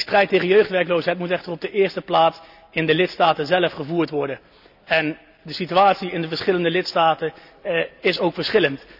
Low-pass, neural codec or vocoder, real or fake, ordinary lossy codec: 5.4 kHz; none; real; none